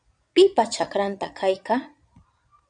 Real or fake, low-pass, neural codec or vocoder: fake; 9.9 kHz; vocoder, 22.05 kHz, 80 mel bands, Vocos